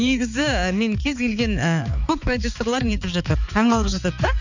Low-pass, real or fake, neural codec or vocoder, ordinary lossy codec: 7.2 kHz; fake; codec, 16 kHz, 4 kbps, X-Codec, HuBERT features, trained on balanced general audio; none